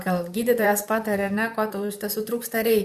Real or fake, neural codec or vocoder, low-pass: fake; vocoder, 44.1 kHz, 128 mel bands, Pupu-Vocoder; 14.4 kHz